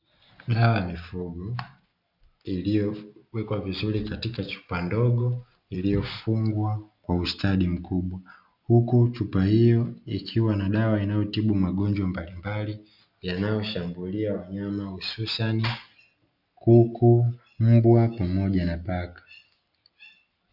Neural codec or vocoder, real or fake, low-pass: none; real; 5.4 kHz